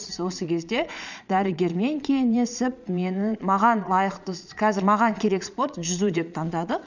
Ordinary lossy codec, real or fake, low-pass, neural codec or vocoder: none; fake; 7.2 kHz; vocoder, 22.05 kHz, 80 mel bands, Vocos